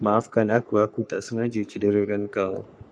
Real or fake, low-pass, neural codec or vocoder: fake; 9.9 kHz; codec, 44.1 kHz, 3.4 kbps, Pupu-Codec